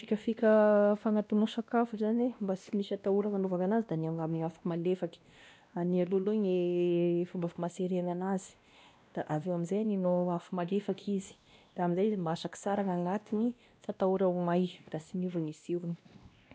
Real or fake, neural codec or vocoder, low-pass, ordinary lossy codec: fake; codec, 16 kHz, 1 kbps, X-Codec, WavLM features, trained on Multilingual LibriSpeech; none; none